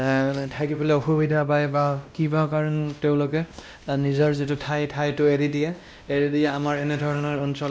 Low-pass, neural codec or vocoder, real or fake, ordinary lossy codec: none; codec, 16 kHz, 1 kbps, X-Codec, WavLM features, trained on Multilingual LibriSpeech; fake; none